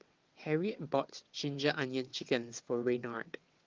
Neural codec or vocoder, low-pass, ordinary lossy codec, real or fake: vocoder, 22.05 kHz, 80 mel bands, WaveNeXt; 7.2 kHz; Opus, 32 kbps; fake